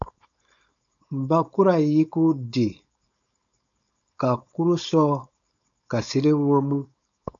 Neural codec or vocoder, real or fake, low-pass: codec, 16 kHz, 4.8 kbps, FACodec; fake; 7.2 kHz